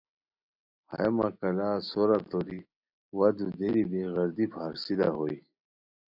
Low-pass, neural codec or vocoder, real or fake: 5.4 kHz; none; real